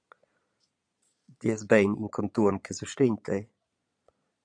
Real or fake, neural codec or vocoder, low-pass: fake; vocoder, 22.05 kHz, 80 mel bands, Vocos; 9.9 kHz